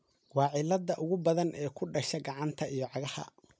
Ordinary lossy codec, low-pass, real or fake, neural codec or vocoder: none; none; real; none